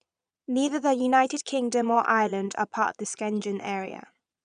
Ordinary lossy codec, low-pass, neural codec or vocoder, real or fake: none; 9.9 kHz; vocoder, 22.05 kHz, 80 mel bands, Vocos; fake